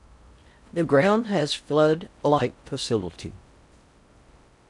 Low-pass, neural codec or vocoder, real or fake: 10.8 kHz; codec, 16 kHz in and 24 kHz out, 0.6 kbps, FocalCodec, streaming, 4096 codes; fake